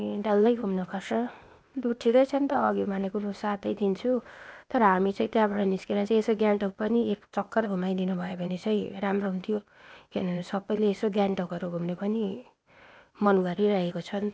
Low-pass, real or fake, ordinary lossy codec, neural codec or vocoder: none; fake; none; codec, 16 kHz, 0.8 kbps, ZipCodec